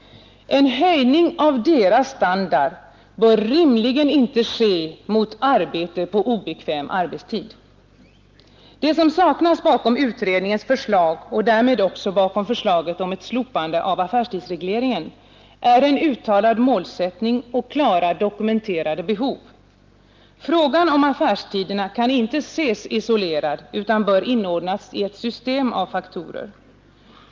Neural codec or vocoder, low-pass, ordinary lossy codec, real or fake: none; 7.2 kHz; Opus, 32 kbps; real